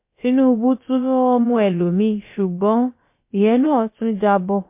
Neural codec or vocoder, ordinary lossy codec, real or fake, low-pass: codec, 16 kHz, about 1 kbps, DyCAST, with the encoder's durations; MP3, 24 kbps; fake; 3.6 kHz